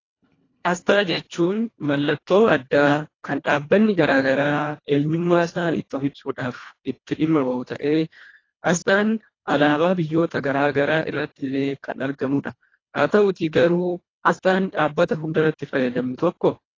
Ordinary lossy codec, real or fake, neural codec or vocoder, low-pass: AAC, 32 kbps; fake; codec, 24 kHz, 1.5 kbps, HILCodec; 7.2 kHz